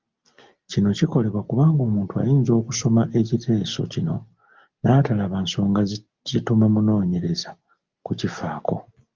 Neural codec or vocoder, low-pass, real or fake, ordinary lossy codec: none; 7.2 kHz; real; Opus, 32 kbps